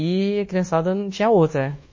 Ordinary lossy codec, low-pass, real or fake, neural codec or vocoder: MP3, 32 kbps; 7.2 kHz; fake; codec, 24 kHz, 1.2 kbps, DualCodec